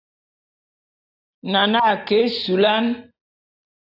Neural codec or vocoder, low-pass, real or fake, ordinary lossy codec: none; 5.4 kHz; real; AAC, 32 kbps